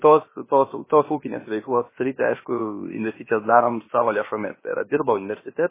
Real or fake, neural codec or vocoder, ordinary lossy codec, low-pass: fake; codec, 16 kHz, about 1 kbps, DyCAST, with the encoder's durations; MP3, 16 kbps; 3.6 kHz